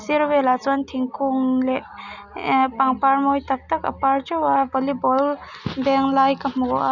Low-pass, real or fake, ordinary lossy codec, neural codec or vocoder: 7.2 kHz; real; none; none